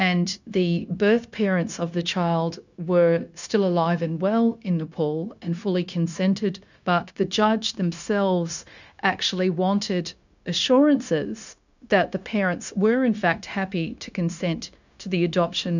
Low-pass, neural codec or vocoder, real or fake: 7.2 kHz; codec, 16 kHz, 0.9 kbps, LongCat-Audio-Codec; fake